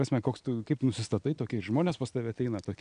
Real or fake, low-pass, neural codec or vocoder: real; 9.9 kHz; none